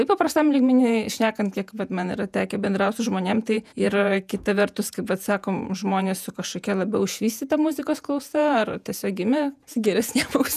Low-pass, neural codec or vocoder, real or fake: 14.4 kHz; vocoder, 48 kHz, 128 mel bands, Vocos; fake